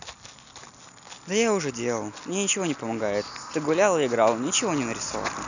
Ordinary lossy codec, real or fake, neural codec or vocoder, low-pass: none; real; none; 7.2 kHz